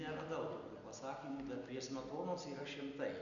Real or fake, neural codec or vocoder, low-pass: real; none; 7.2 kHz